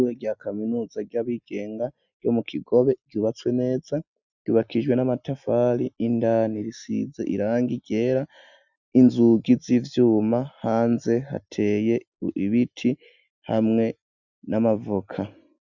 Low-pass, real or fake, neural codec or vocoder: 7.2 kHz; real; none